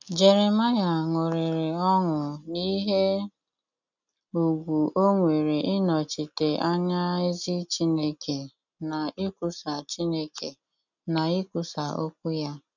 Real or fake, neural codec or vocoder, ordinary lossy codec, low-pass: real; none; none; 7.2 kHz